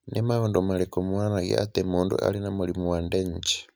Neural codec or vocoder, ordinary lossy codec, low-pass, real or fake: none; none; none; real